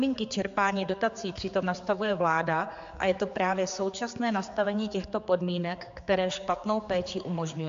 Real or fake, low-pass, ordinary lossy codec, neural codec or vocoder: fake; 7.2 kHz; AAC, 64 kbps; codec, 16 kHz, 4 kbps, X-Codec, HuBERT features, trained on general audio